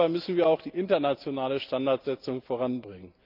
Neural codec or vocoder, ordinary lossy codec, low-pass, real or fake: none; Opus, 32 kbps; 5.4 kHz; real